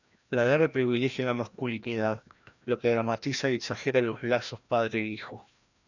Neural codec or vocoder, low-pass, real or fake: codec, 16 kHz, 1 kbps, FreqCodec, larger model; 7.2 kHz; fake